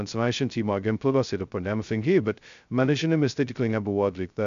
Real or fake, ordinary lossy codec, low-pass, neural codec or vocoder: fake; MP3, 64 kbps; 7.2 kHz; codec, 16 kHz, 0.2 kbps, FocalCodec